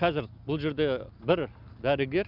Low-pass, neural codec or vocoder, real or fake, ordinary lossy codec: 5.4 kHz; none; real; none